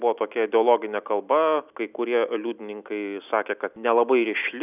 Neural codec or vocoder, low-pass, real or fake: none; 3.6 kHz; real